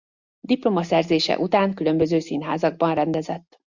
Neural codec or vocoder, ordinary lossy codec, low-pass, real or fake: none; Opus, 64 kbps; 7.2 kHz; real